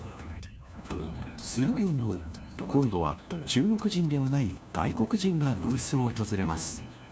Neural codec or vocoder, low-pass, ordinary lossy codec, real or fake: codec, 16 kHz, 1 kbps, FunCodec, trained on LibriTTS, 50 frames a second; none; none; fake